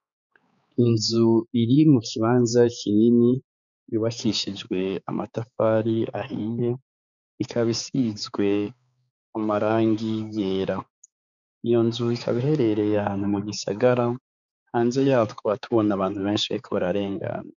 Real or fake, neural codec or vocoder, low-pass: fake; codec, 16 kHz, 4 kbps, X-Codec, HuBERT features, trained on balanced general audio; 7.2 kHz